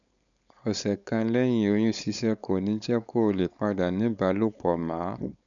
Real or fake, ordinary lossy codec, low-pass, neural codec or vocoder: fake; none; 7.2 kHz; codec, 16 kHz, 4.8 kbps, FACodec